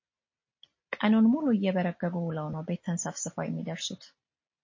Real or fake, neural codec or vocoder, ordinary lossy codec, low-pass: real; none; MP3, 32 kbps; 7.2 kHz